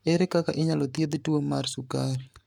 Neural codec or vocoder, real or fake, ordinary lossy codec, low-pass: codec, 44.1 kHz, 7.8 kbps, Pupu-Codec; fake; none; 19.8 kHz